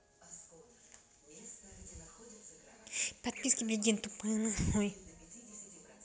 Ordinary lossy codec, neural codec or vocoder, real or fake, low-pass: none; none; real; none